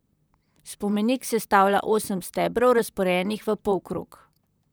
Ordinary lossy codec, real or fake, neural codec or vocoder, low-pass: none; fake; vocoder, 44.1 kHz, 128 mel bands, Pupu-Vocoder; none